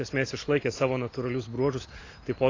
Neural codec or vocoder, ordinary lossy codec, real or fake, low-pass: none; AAC, 32 kbps; real; 7.2 kHz